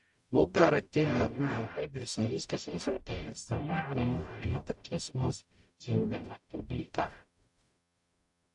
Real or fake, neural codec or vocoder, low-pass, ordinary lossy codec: fake; codec, 44.1 kHz, 0.9 kbps, DAC; 10.8 kHz; AAC, 64 kbps